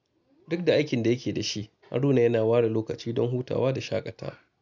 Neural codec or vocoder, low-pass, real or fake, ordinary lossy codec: none; 7.2 kHz; real; none